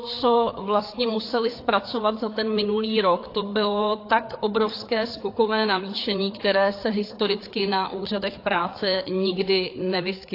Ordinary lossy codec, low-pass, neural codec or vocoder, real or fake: AAC, 32 kbps; 5.4 kHz; codec, 16 kHz, 4 kbps, FreqCodec, larger model; fake